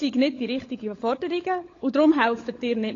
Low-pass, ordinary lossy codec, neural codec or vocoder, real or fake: 7.2 kHz; AAC, 32 kbps; codec, 16 kHz, 16 kbps, FunCodec, trained on LibriTTS, 50 frames a second; fake